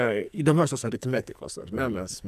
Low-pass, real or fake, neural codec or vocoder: 14.4 kHz; fake; codec, 44.1 kHz, 2.6 kbps, SNAC